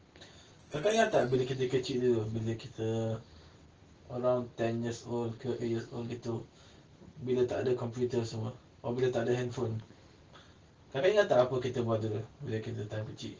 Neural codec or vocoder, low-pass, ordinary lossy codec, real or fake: none; 7.2 kHz; Opus, 16 kbps; real